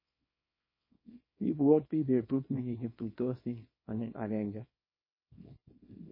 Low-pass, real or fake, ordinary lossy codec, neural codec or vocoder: 5.4 kHz; fake; MP3, 24 kbps; codec, 24 kHz, 0.9 kbps, WavTokenizer, small release